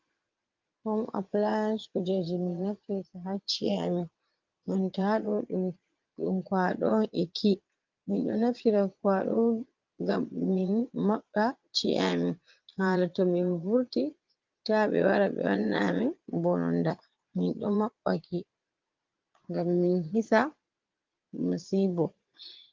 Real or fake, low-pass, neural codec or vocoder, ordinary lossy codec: fake; 7.2 kHz; vocoder, 22.05 kHz, 80 mel bands, Vocos; Opus, 32 kbps